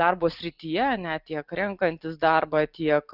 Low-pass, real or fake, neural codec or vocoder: 5.4 kHz; real; none